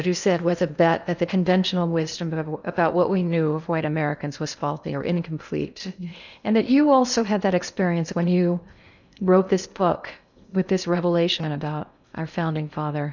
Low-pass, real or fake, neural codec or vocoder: 7.2 kHz; fake; codec, 16 kHz in and 24 kHz out, 0.8 kbps, FocalCodec, streaming, 65536 codes